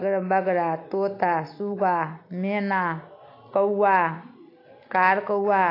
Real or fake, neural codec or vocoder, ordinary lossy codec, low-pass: real; none; AAC, 32 kbps; 5.4 kHz